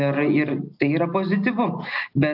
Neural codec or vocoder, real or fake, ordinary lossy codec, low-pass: none; real; AAC, 48 kbps; 5.4 kHz